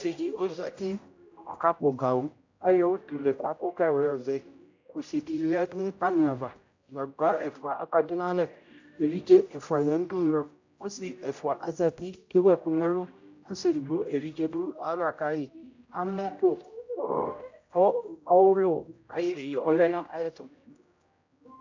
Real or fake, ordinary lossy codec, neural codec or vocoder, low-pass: fake; AAC, 48 kbps; codec, 16 kHz, 0.5 kbps, X-Codec, HuBERT features, trained on general audio; 7.2 kHz